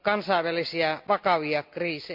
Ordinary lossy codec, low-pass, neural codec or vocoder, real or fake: MP3, 48 kbps; 5.4 kHz; none; real